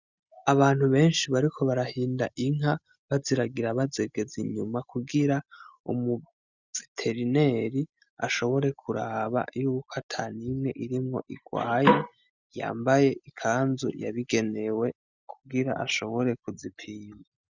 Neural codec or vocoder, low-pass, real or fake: none; 7.2 kHz; real